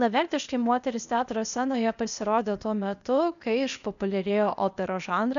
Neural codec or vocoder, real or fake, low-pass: codec, 16 kHz, 0.8 kbps, ZipCodec; fake; 7.2 kHz